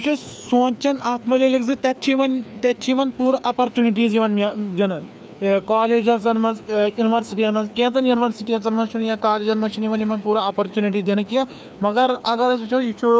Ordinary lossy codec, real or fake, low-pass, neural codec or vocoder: none; fake; none; codec, 16 kHz, 2 kbps, FreqCodec, larger model